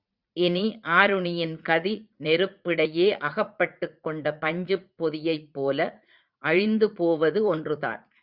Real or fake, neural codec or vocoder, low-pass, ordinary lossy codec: fake; vocoder, 44.1 kHz, 80 mel bands, Vocos; 5.4 kHz; Opus, 64 kbps